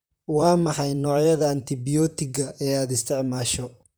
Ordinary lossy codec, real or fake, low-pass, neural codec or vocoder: none; fake; none; vocoder, 44.1 kHz, 128 mel bands, Pupu-Vocoder